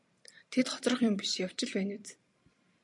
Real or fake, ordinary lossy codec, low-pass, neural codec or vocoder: fake; MP3, 64 kbps; 10.8 kHz; vocoder, 44.1 kHz, 128 mel bands every 256 samples, BigVGAN v2